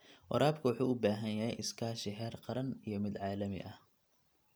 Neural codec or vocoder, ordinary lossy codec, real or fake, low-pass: none; none; real; none